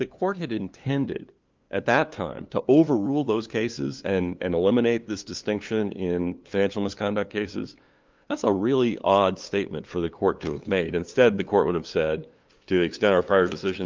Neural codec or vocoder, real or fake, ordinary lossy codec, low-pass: codec, 16 kHz, 2 kbps, FunCodec, trained on LibriTTS, 25 frames a second; fake; Opus, 24 kbps; 7.2 kHz